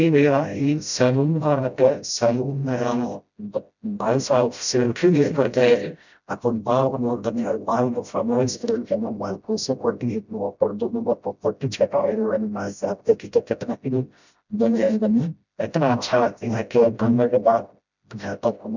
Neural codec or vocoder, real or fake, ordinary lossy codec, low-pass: codec, 16 kHz, 0.5 kbps, FreqCodec, smaller model; fake; none; 7.2 kHz